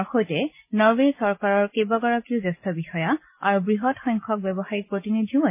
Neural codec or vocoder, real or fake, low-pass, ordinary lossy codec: none; real; 3.6 kHz; MP3, 24 kbps